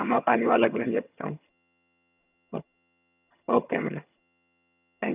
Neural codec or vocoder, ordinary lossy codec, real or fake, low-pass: vocoder, 22.05 kHz, 80 mel bands, HiFi-GAN; none; fake; 3.6 kHz